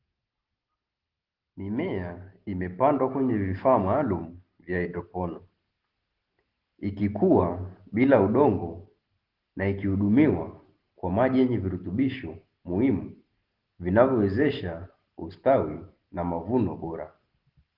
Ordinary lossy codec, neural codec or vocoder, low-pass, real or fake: Opus, 16 kbps; none; 5.4 kHz; real